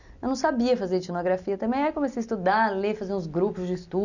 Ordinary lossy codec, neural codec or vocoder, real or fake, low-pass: none; none; real; 7.2 kHz